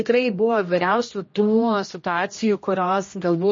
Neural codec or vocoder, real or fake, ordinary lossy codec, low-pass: codec, 16 kHz, 1 kbps, X-Codec, HuBERT features, trained on general audio; fake; MP3, 32 kbps; 7.2 kHz